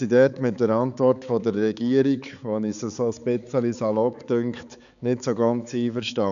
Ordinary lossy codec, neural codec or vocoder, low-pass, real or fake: none; codec, 16 kHz, 4 kbps, X-Codec, HuBERT features, trained on balanced general audio; 7.2 kHz; fake